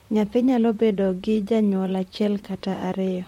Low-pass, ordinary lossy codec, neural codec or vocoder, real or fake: 19.8 kHz; MP3, 64 kbps; vocoder, 44.1 kHz, 128 mel bands, Pupu-Vocoder; fake